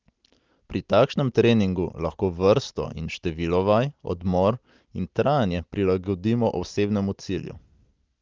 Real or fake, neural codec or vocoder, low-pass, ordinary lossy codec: real; none; 7.2 kHz; Opus, 32 kbps